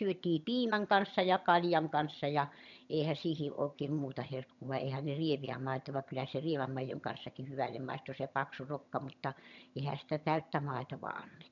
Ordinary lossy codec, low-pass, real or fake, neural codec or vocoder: none; 7.2 kHz; fake; vocoder, 22.05 kHz, 80 mel bands, HiFi-GAN